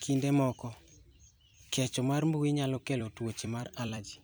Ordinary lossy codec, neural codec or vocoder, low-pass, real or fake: none; vocoder, 44.1 kHz, 128 mel bands every 256 samples, BigVGAN v2; none; fake